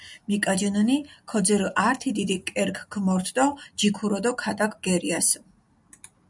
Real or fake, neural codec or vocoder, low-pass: real; none; 10.8 kHz